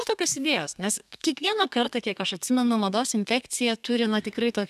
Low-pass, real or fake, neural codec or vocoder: 14.4 kHz; fake; codec, 32 kHz, 1.9 kbps, SNAC